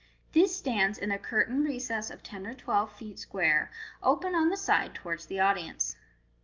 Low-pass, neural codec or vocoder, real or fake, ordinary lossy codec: 7.2 kHz; none; real; Opus, 32 kbps